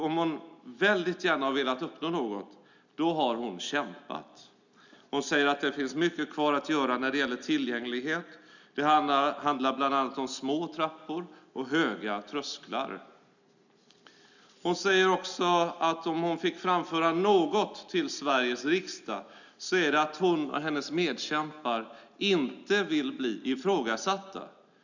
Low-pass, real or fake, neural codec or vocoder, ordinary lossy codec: 7.2 kHz; real; none; none